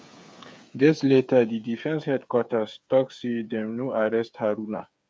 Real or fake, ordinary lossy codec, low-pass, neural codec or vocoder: fake; none; none; codec, 16 kHz, 8 kbps, FreqCodec, smaller model